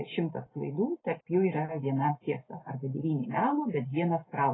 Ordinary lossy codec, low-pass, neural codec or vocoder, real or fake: AAC, 16 kbps; 7.2 kHz; none; real